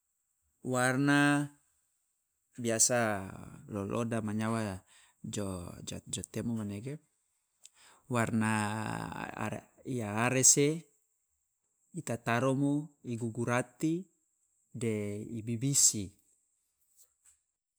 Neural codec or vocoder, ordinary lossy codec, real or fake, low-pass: none; none; real; none